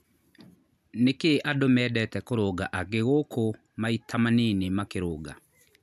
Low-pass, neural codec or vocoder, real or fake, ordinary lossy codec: 14.4 kHz; none; real; none